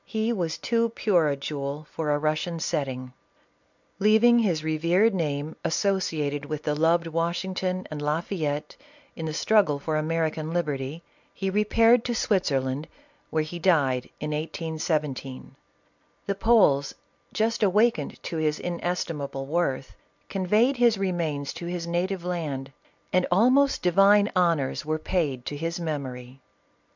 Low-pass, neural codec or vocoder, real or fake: 7.2 kHz; none; real